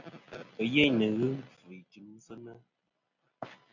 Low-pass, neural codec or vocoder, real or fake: 7.2 kHz; none; real